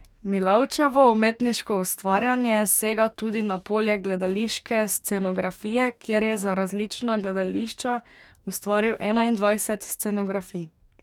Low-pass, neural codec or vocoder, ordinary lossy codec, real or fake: 19.8 kHz; codec, 44.1 kHz, 2.6 kbps, DAC; none; fake